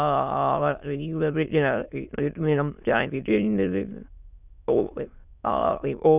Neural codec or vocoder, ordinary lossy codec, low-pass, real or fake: autoencoder, 22.05 kHz, a latent of 192 numbers a frame, VITS, trained on many speakers; none; 3.6 kHz; fake